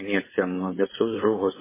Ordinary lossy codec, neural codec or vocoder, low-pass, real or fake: MP3, 16 kbps; codec, 16 kHz, 4.8 kbps, FACodec; 3.6 kHz; fake